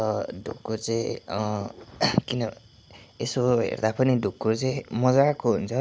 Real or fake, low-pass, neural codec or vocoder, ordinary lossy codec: real; none; none; none